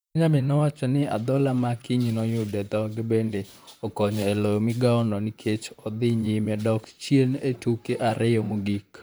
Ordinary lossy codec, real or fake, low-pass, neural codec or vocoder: none; fake; none; vocoder, 44.1 kHz, 128 mel bands, Pupu-Vocoder